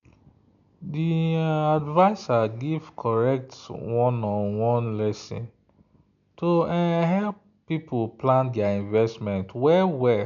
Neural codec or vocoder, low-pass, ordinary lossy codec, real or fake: none; 7.2 kHz; none; real